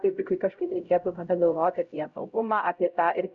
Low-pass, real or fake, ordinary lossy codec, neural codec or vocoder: 7.2 kHz; fake; Opus, 64 kbps; codec, 16 kHz, 0.5 kbps, X-Codec, HuBERT features, trained on LibriSpeech